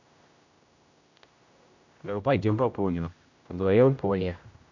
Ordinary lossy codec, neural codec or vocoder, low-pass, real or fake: none; codec, 16 kHz, 0.5 kbps, X-Codec, HuBERT features, trained on general audio; 7.2 kHz; fake